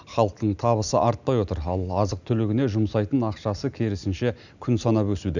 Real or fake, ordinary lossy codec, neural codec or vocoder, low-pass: real; none; none; 7.2 kHz